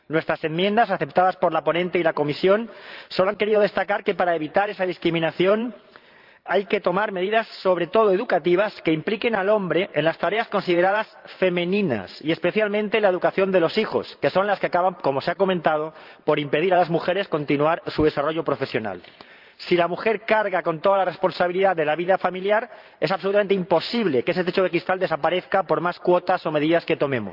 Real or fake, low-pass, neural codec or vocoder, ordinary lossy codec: fake; 5.4 kHz; vocoder, 44.1 kHz, 128 mel bands every 512 samples, BigVGAN v2; Opus, 24 kbps